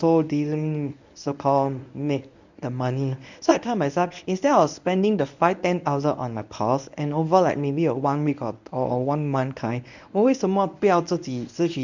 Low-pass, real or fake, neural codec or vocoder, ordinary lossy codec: 7.2 kHz; fake; codec, 24 kHz, 0.9 kbps, WavTokenizer, medium speech release version 1; none